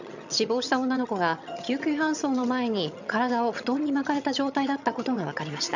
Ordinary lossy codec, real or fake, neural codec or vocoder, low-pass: none; fake; vocoder, 22.05 kHz, 80 mel bands, HiFi-GAN; 7.2 kHz